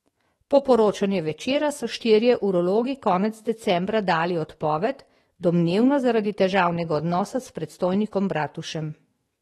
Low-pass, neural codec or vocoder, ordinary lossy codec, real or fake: 19.8 kHz; autoencoder, 48 kHz, 128 numbers a frame, DAC-VAE, trained on Japanese speech; AAC, 32 kbps; fake